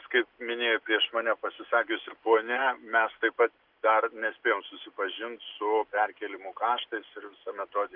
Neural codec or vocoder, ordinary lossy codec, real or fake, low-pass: none; Opus, 24 kbps; real; 5.4 kHz